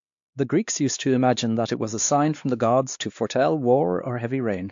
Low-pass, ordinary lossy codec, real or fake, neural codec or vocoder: 7.2 kHz; AAC, 64 kbps; fake; codec, 16 kHz, 2 kbps, X-Codec, WavLM features, trained on Multilingual LibriSpeech